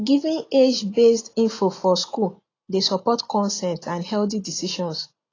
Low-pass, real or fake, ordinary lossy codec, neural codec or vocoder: 7.2 kHz; real; AAC, 32 kbps; none